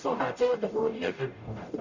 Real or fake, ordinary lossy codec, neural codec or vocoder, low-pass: fake; Opus, 64 kbps; codec, 44.1 kHz, 0.9 kbps, DAC; 7.2 kHz